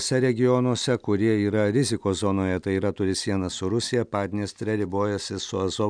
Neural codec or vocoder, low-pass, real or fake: none; 9.9 kHz; real